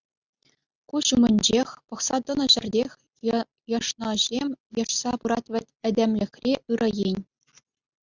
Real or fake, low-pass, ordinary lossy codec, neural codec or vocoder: real; 7.2 kHz; Opus, 64 kbps; none